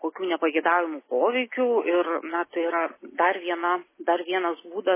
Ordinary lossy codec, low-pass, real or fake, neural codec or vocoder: MP3, 16 kbps; 3.6 kHz; real; none